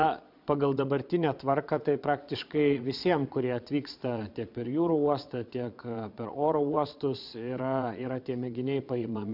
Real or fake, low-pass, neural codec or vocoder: fake; 5.4 kHz; vocoder, 22.05 kHz, 80 mel bands, Vocos